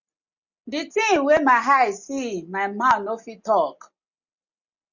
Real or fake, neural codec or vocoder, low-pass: real; none; 7.2 kHz